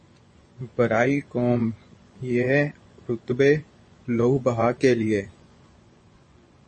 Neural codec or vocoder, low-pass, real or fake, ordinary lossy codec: vocoder, 24 kHz, 100 mel bands, Vocos; 10.8 kHz; fake; MP3, 32 kbps